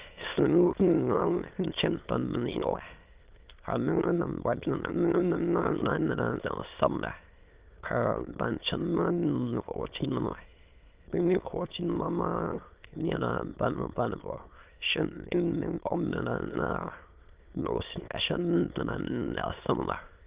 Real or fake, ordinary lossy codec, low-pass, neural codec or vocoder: fake; Opus, 32 kbps; 3.6 kHz; autoencoder, 22.05 kHz, a latent of 192 numbers a frame, VITS, trained on many speakers